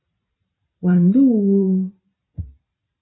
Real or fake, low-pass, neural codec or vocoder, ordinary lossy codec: fake; 7.2 kHz; vocoder, 44.1 kHz, 128 mel bands every 512 samples, BigVGAN v2; AAC, 16 kbps